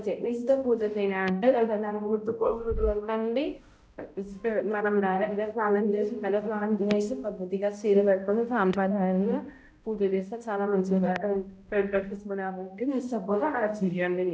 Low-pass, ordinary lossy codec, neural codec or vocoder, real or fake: none; none; codec, 16 kHz, 0.5 kbps, X-Codec, HuBERT features, trained on balanced general audio; fake